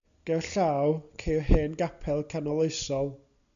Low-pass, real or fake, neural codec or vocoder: 7.2 kHz; real; none